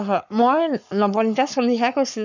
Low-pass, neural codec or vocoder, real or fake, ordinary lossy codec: 7.2 kHz; codec, 44.1 kHz, 7.8 kbps, Pupu-Codec; fake; none